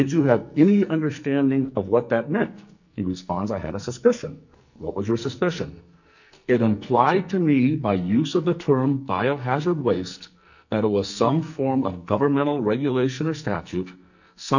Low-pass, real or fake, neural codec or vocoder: 7.2 kHz; fake; codec, 44.1 kHz, 2.6 kbps, SNAC